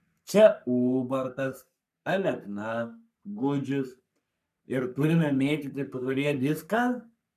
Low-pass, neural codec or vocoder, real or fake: 14.4 kHz; codec, 44.1 kHz, 3.4 kbps, Pupu-Codec; fake